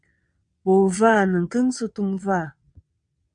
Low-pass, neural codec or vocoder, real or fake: 9.9 kHz; vocoder, 22.05 kHz, 80 mel bands, WaveNeXt; fake